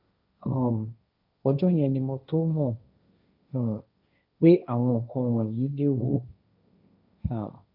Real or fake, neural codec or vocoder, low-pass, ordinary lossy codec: fake; codec, 16 kHz, 1.1 kbps, Voila-Tokenizer; 5.4 kHz; none